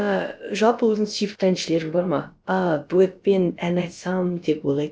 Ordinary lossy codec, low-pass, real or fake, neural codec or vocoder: none; none; fake; codec, 16 kHz, about 1 kbps, DyCAST, with the encoder's durations